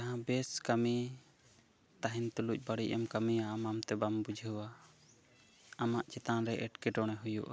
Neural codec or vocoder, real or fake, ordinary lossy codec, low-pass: none; real; none; none